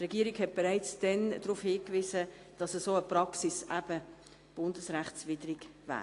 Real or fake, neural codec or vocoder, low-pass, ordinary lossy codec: real; none; 10.8 kHz; AAC, 48 kbps